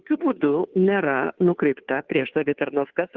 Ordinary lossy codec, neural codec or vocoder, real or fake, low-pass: Opus, 16 kbps; codec, 16 kHz, 2 kbps, FunCodec, trained on Chinese and English, 25 frames a second; fake; 7.2 kHz